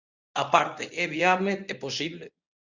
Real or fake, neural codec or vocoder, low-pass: fake; codec, 24 kHz, 0.9 kbps, WavTokenizer, medium speech release version 1; 7.2 kHz